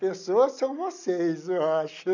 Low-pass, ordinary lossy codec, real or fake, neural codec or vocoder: 7.2 kHz; none; real; none